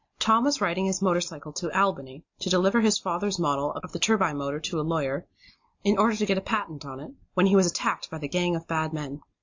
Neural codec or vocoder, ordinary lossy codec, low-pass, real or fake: none; AAC, 48 kbps; 7.2 kHz; real